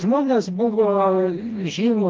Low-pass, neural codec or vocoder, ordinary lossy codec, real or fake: 7.2 kHz; codec, 16 kHz, 1 kbps, FreqCodec, smaller model; Opus, 24 kbps; fake